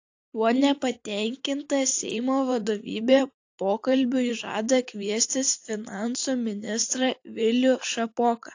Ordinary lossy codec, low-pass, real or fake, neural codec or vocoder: AAC, 48 kbps; 7.2 kHz; fake; vocoder, 44.1 kHz, 128 mel bands every 512 samples, BigVGAN v2